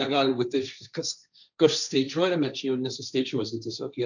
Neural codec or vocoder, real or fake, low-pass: codec, 16 kHz, 1.1 kbps, Voila-Tokenizer; fake; 7.2 kHz